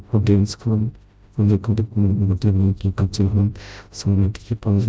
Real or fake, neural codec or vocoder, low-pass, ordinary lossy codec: fake; codec, 16 kHz, 0.5 kbps, FreqCodec, smaller model; none; none